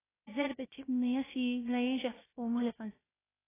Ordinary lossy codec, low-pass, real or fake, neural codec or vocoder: AAC, 16 kbps; 3.6 kHz; fake; codec, 16 kHz, 0.3 kbps, FocalCodec